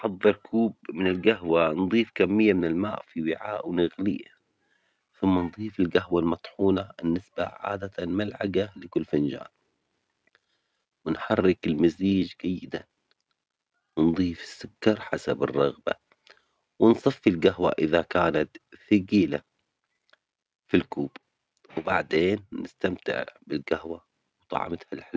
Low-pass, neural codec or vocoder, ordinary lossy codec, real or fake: none; none; none; real